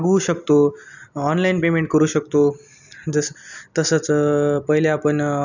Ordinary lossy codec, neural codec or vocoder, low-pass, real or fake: none; none; 7.2 kHz; real